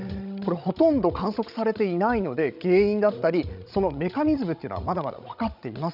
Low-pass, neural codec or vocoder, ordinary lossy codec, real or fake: 5.4 kHz; codec, 16 kHz, 16 kbps, FreqCodec, larger model; none; fake